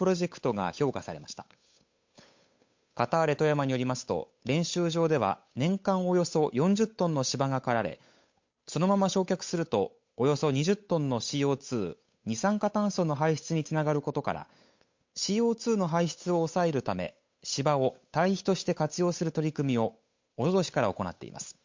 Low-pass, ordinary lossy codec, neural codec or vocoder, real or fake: 7.2 kHz; MP3, 48 kbps; codec, 16 kHz, 8 kbps, FunCodec, trained on Chinese and English, 25 frames a second; fake